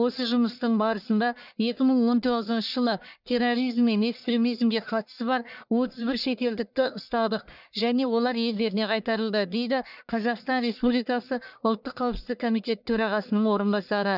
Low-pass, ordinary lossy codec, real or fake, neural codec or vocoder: 5.4 kHz; none; fake; codec, 44.1 kHz, 1.7 kbps, Pupu-Codec